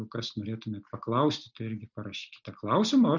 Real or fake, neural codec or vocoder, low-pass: real; none; 7.2 kHz